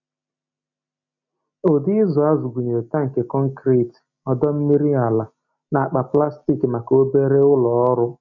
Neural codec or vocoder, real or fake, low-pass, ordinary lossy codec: none; real; 7.2 kHz; none